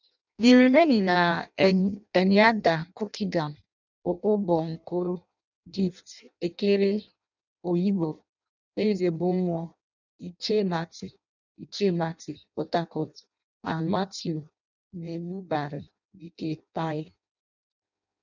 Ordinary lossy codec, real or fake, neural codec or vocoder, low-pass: none; fake; codec, 16 kHz in and 24 kHz out, 0.6 kbps, FireRedTTS-2 codec; 7.2 kHz